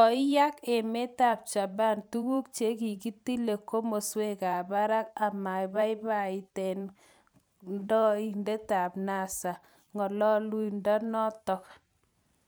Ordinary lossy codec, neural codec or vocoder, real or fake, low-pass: none; vocoder, 44.1 kHz, 128 mel bands every 512 samples, BigVGAN v2; fake; none